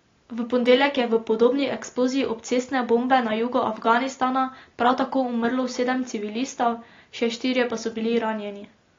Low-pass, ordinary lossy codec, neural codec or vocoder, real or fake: 7.2 kHz; AAC, 32 kbps; none; real